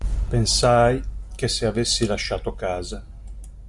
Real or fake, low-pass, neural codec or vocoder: real; 10.8 kHz; none